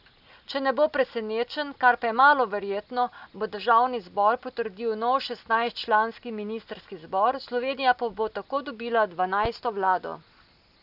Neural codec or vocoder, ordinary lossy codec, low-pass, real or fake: none; none; 5.4 kHz; real